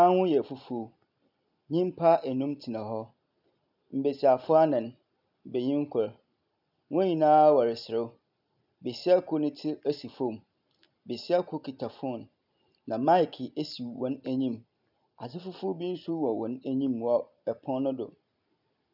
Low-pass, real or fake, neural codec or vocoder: 5.4 kHz; real; none